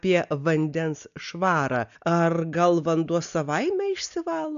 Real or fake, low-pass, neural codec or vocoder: real; 7.2 kHz; none